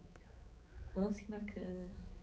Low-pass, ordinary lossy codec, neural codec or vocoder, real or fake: none; none; codec, 16 kHz, 4 kbps, X-Codec, HuBERT features, trained on balanced general audio; fake